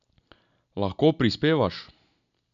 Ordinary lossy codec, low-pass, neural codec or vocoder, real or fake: none; 7.2 kHz; none; real